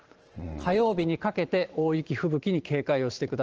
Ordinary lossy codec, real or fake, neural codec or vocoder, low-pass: Opus, 16 kbps; fake; vocoder, 44.1 kHz, 128 mel bands every 512 samples, BigVGAN v2; 7.2 kHz